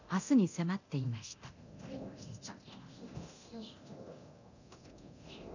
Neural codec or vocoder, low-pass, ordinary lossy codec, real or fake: codec, 24 kHz, 0.9 kbps, DualCodec; 7.2 kHz; none; fake